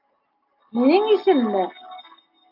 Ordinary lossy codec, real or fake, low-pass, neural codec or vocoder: AAC, 48 kbps; real; 5.4 kHz; none